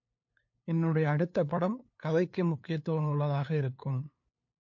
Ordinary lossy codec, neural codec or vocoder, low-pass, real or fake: MP3, 48 kbps; codec, 16 kHz, 2 kbps, FunCodec, trained on LibriTTS, 25 frames a second; 7.2 kHz; fake